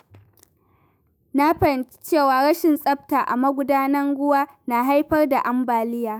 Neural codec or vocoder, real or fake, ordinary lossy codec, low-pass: autoencoder, 48 kHz, 128 numbers a frame, DAC-VAE, trained on Japanese speech; fake; none; none